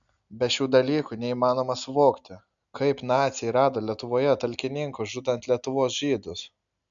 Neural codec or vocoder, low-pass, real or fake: none; 7.2 kHz; real